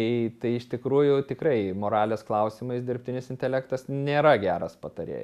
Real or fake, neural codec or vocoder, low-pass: real; none; 14.4 kHz